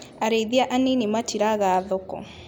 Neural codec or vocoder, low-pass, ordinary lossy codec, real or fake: none; 19.8 kHz; none; real